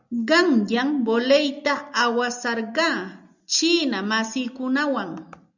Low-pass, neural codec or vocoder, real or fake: 7.2 kHz; none; real